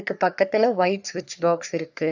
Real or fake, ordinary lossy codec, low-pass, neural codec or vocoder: fake; none; 7.2 kHz; codec, 44.1 kHz, 3.4 kbps, Pupu-Codec